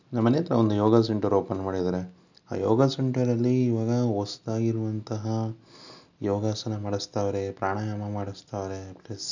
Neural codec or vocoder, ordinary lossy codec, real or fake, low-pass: none; none; real; 7.2 kHz